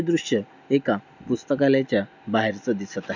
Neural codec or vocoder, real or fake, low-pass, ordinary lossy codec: none; real; 7.2 kHz; none